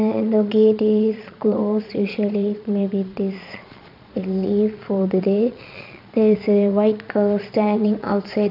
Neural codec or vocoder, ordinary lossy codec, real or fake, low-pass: vocoder, 22.05 kHz, 80 mel bands, Vocos; none; fake; 5.4 kHz